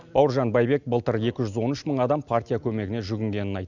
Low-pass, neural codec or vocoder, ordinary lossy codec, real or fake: 7.2 kHz; none; none; real